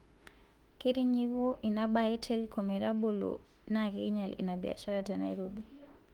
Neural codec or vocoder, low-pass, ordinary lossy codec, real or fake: autoencoder, 48 kHz, 32 numbers a frame, DAC-VAE, trained on Japanese speech; 19.8 kHz; Opus, 24 kbps; fake